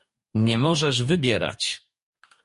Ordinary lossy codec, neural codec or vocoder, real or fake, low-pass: MP3, 48 kbps; codec, 44.1 kHz, 2.6 kbps, DAC; fake; 14.4 kHz